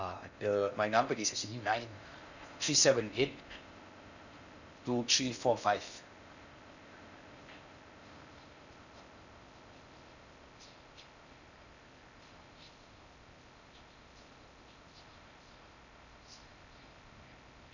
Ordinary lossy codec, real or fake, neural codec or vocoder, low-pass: none; fake; codec, 16 kHz in and 24 kHz out, 0.6 kbps, FocalCodec, streaming, 4096 codes; 7.2 kHz